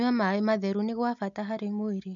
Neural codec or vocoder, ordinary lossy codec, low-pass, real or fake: none; none; 7.2 kHz; real